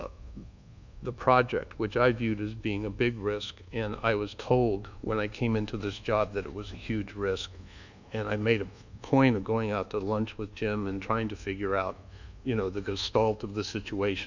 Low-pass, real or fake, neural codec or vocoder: 7.2 kHz; fake; codec, 24 kHz, 1.2 kbps, DualCodec